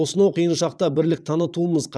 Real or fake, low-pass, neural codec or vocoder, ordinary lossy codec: fake; none; vocoder, 22.05 kHz, 80 mel bands, Vocos; none